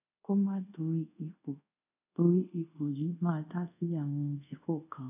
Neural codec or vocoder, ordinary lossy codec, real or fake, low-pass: codec, 24 kHz, 0.5 kbps, DualCodec; none; fake; 3.6 kHz